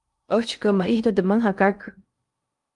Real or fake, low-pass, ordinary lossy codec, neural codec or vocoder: fake; 10.8 kHz; Opus, 32 kbps; codec, 16 kHz in and 24 kHz out, 0.6 kbps, FocalCodec, streaming, 2048 codes